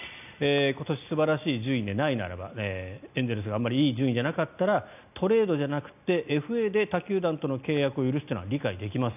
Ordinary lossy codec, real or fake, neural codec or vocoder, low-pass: none; real; none; 3.6 kHz